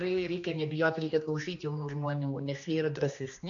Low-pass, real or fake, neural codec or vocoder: 7.2 kHz; fake; codec, 16 kHz, 2 kbps, X-Codec, HuBERT features, trained on general audio